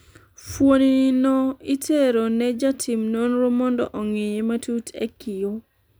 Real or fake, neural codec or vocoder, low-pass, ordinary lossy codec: real; none; none; none